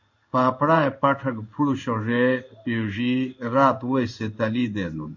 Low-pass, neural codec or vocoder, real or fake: 7.2 kHz; codec, 16 kHz in and 24 kHz out, 1 kbps, XY-Tokenizer; fake